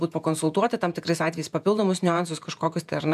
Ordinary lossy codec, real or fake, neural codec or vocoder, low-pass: MP3, 96 kbps; fake; vocoder, 48 kHz, 128 mel bands, Vocos; 14.4 kHz